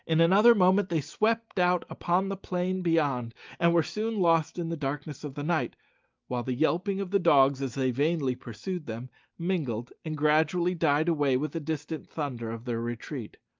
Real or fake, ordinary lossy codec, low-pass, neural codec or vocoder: real; Opus, 24 kbps; 7.2 kHz; none